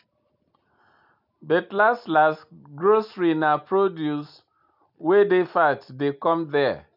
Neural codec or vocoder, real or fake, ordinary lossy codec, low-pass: none; real; none; 5.4 kHz